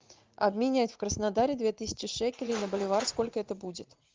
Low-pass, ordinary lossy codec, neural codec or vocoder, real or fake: 7.2 kHz; Opus, 32 kbps; vocoder, 44.1 kHz, 80 mel bands, Vocos; fake